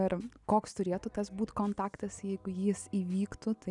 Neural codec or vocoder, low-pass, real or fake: none; 10.8 kHz; real